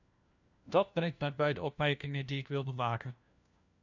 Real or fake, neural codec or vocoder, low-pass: fake; codec, 16 kHz, 1 kbps, FunCodec, trained on LibriTTS, 50 frames a second; 7.2 kHz